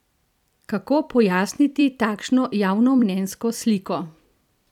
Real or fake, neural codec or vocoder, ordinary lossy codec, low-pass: fake; vocoder, 44.1 kHz, 128 mel bands every 256 samples, BigVGAN v2; none; 19.8 kHz